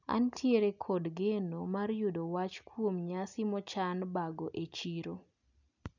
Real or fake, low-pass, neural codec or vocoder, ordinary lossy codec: real; 7.2 kHz; none; none